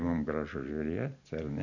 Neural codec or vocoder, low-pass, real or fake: none; 7.2 kHz; real